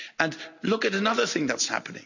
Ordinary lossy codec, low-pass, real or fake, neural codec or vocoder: none; 7.2 kHz; real; none